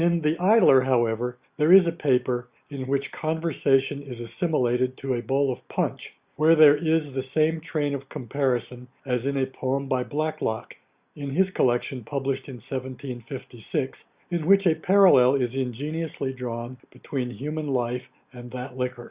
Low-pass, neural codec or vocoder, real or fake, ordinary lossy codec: 3.6 kHz; none; real; Opus, 64 kbps